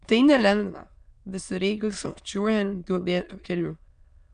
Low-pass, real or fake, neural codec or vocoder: 9.9 kHz; fake; autoencoder, 22.05 kHz, a latent of 192 numbers a frame, VITS, trained on many speakers